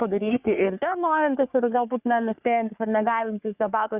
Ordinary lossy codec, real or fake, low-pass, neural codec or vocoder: Opus, 64 kbps; fake; 3.6 kHz; codec, 44.1 kHz, 3.4 kbps, Pupu-Codec